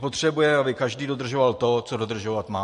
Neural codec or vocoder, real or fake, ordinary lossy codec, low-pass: none; real; MP3, 48 kbps; 14.4 kHz